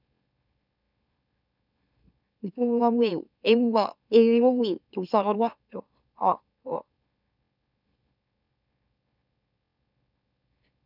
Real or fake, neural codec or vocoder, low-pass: fake; autoencoder, 44.1 kHz, a latent of 192 numbers a frame, MeloTTS; 5.4 kHz